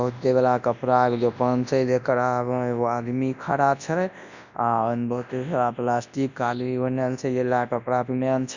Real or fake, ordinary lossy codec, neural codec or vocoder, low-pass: fake; none; codec, 24 kHz, 0.9 kbps, WavTokenizer, large speech release; 7.2 kHz